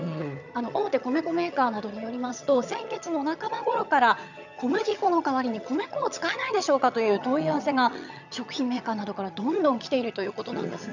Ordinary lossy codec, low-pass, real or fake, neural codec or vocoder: none; 7.2 kHz; fake; vocoder, 22.05 kHz, 80 mel bands, HiFi-GAN